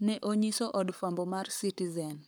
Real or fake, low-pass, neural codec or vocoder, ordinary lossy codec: fake; none; codec, 44.1 kHz, 7.8 kbps, Pupu-Codec; none